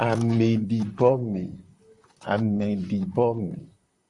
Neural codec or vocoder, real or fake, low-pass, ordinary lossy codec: vocoder, 44.1 kHz, 128 mel bands, Pupu-Vocoder; fake; 10.8 kHz; Opus, 64 kbps